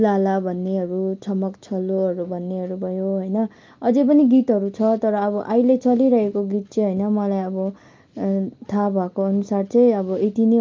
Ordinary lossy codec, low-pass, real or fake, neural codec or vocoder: Opus, 24 kbps; 7.2 kHz; real; none